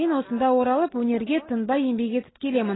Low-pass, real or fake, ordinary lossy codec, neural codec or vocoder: 7.2 kHz; real; AAC, 16 kbps; none